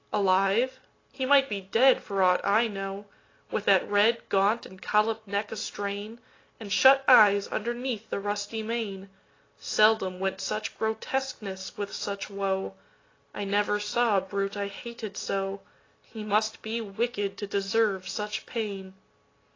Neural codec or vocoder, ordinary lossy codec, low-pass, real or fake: none; AAC, 32 kbps; 7.2 kHz; real